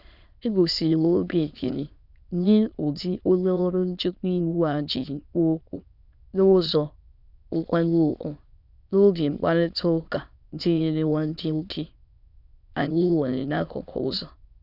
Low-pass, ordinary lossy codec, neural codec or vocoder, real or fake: 5.4 kHz; none; autoencoder, 22.05 kHz, a latent of 192 numbers a frame, VITS, trained on many speakers; fake